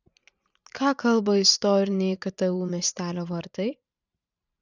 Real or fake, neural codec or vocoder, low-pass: fake; vocoder, 44.1 kHz, 128 mel bands every 512 samples, BigVGAN v2; 7.2 kHz